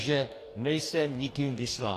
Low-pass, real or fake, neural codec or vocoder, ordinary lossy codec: 14.4 kHz; fake; codec, 44.1 kHz, 2.6 kbps, DAC; AAC, 64 kbps